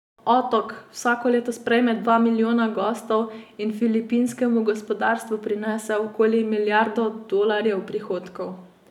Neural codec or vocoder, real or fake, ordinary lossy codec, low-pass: none; real; none; 19.8 kHz